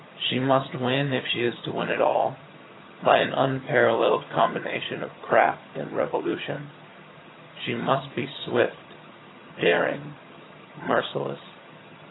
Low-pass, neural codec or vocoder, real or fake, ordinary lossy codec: 7.2 kHz; vocoder, 22.05 kHz, 80 mel bands, HiFi-GAN; fake; AAC, 16 kbps